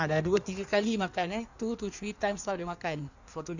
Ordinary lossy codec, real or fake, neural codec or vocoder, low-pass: none; fake; codec, 16 kHz in and 24 kHz out, 1.1 kbps, FireRedTTS-2 codec; 7.2 kHz